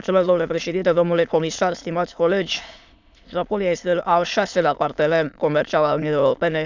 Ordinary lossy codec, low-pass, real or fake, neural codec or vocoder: none; 7.2 kHz; fake; autoencoder, 22.05 kHz, a latent of 192 numbers a frame, VITS, trained on many speakers